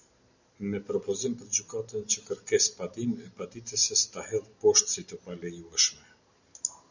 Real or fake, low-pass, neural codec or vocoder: real; 7.2 kHz; none